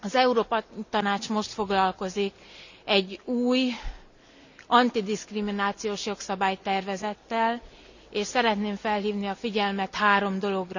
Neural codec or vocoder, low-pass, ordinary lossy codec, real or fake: none; 7.2 kHz; none; real